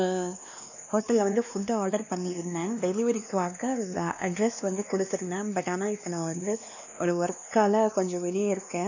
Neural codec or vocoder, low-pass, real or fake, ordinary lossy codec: codec, 16 kHz, 2 kbps, X-Codec, WavLM features, trained on Multilingual LibriSpeech; 7.2 kHz; fake; none